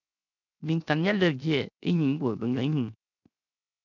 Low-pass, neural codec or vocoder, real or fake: 7.2 kHz; codec, 16 kHz, 0.7 kbps, FocalCodec; fake